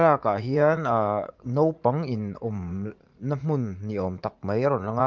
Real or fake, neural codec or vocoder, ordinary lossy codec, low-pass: fake; vocoder, 44.1 kHz, 80 mel bands, Vocos; Opus, 24 kbps; 7.2 kHz